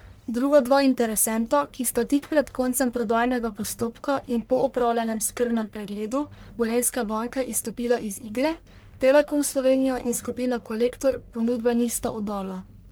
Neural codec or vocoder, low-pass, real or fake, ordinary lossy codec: codec, 44.1 kHz, 1.7 kbps, Pupu-Codec; none; fake; none